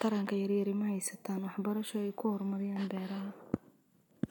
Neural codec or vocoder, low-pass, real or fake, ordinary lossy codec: none; none; real; none